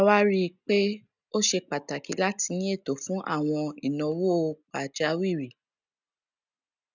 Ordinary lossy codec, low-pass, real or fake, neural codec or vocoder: none; 7.2 kHz; real; none